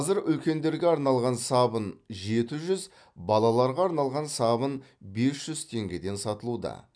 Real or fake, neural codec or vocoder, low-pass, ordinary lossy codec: real; none; 9.9 kHz; none